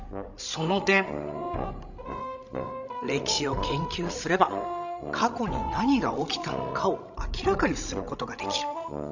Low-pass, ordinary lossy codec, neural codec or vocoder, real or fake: 7.2 kHz; none; codec, 16 kHz, 16 kbps, FreqCodec, larger model; fake